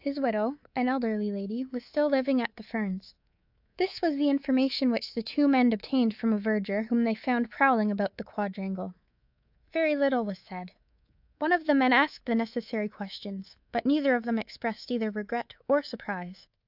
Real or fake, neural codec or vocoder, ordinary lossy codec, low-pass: fake; codec, 24 kHz, 3.1 kbps, DualCodec; AAC, 48 kbps; 5.4 kHz